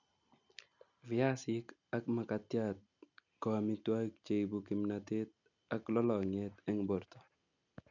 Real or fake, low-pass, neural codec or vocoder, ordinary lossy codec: real; 7.2 kHz; none; none